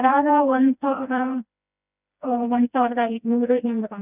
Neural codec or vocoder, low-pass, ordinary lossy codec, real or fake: codec, 16 kHz, 1 kbps, FreqCodec, smaller model; 3.6 kHz; none; fake